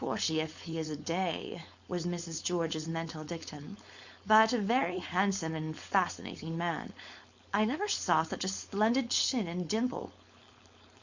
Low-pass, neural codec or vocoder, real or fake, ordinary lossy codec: 7.2 kHz; codec, 16 kHz, 4.8 kbps, FACodec; fake; Opus, 64 kbps